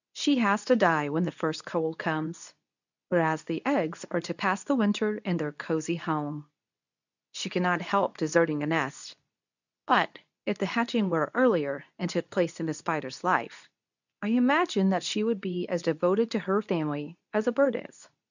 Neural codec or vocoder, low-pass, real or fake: codec, 24 kHz, 0.9 kbps, WavTokenizer, medium speech release version 2; 7.2 kHz; fake